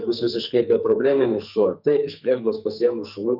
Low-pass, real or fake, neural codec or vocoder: 5.4 kHz; fake; codec, 44.1 kHz, 2.6 kbps, SNAC